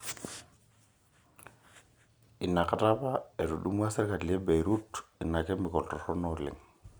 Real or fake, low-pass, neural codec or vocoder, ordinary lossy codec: real; none; none; none